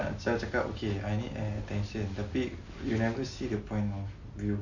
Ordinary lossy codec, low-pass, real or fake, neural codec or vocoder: none; 7.2 kHz; real; none